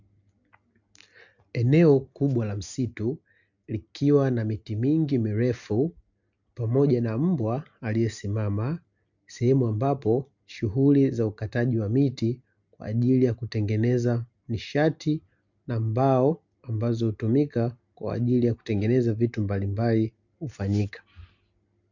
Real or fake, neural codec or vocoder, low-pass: real; none; 7.2 kHz